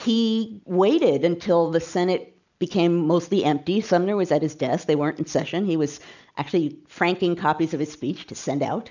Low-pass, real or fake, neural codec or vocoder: 7.2 kHz; real; none